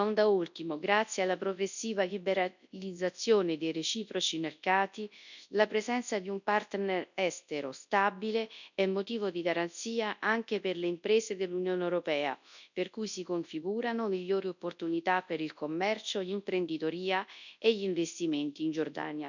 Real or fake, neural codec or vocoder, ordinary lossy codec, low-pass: fake; codec, 24 kHz, 0.9 kbps, WavTokenizer, large speech release; none; 7.2 kHz